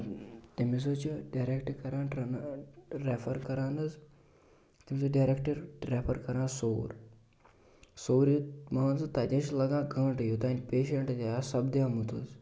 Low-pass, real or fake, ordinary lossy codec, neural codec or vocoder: none; real; none; none